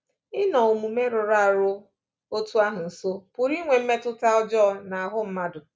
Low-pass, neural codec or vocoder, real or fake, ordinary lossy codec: none; none; real; none